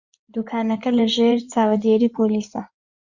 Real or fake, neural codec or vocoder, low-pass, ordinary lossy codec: fake; codec, 16 kHz in and 24 kHz out, 2.2 kbps, FireRedTTS-2 codec; 7.2 kHz; Opus, 64 kbps